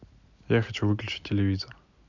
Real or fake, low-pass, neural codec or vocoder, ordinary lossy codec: real; 7.2 kHz; none; none